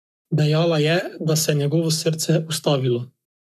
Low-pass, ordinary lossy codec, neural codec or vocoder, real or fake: 14.4 kHz; none; codec, 44.1 kHz, 7.8 kbps, Pupu-Codec; fake